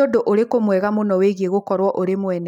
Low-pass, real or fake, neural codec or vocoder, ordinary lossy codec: 19.8 kHz; real; none; none